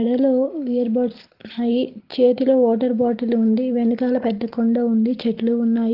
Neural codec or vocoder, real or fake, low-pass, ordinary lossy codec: none; real; 5.4 kHz; Opus, 16 kbps